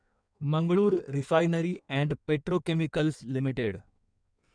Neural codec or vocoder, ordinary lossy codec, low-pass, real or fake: codec, 16 kHz in and 24 kHz out, 1.1 kbps, FireRedTTS-2 codec; none; 9.9 kHz; fake